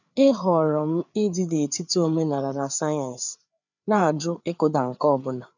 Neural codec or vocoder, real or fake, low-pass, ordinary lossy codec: codec, 16 kHz, 4 kbps, FreqCodec, larger model; fake; 7.2 kHz; none